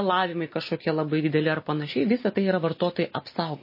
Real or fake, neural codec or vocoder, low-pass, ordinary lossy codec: real; none; 5.4 kHz; MP3, 24 kbps